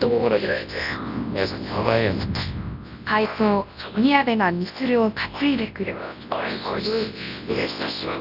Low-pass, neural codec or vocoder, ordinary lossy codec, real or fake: 5.4 kHz; codec, 24 kHz, 0.9 kbps, WavTokenizer, large speech release; none; fake